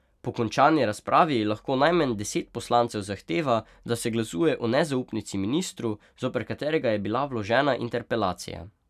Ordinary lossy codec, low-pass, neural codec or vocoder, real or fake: none; 14.4 kHz; none; real